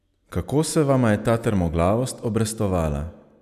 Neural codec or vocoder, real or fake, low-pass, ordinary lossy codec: none; real; 14.4 kHz; none